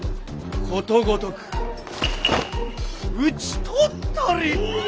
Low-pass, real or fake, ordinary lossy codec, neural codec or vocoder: none; real; none; none